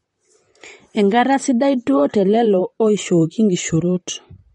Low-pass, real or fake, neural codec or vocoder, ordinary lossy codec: 19.8 kHz; fake; vocoder, 44.1 kHz, 128 mel bands, Pupu-Vocoder; MP3, 48 kbps